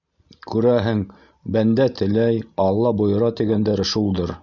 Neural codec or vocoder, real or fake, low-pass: none; real; 7.2 kHz